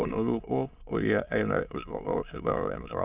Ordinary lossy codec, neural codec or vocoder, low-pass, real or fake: Opus, 24 kbps; autoencoder, 22.05 kHz, a latent of 192 numbers a frame, VITS, trained on many speakers; 3.6 kHz; fake